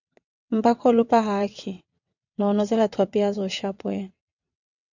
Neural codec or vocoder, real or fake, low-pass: vocoder, 22.05 kHz, 80 mel bands, WaveNeXt; fake; 7.2 kHz